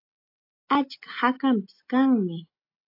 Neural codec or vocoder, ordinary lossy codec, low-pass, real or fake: none; AAC, 32 kbps; 5.4 kHz; real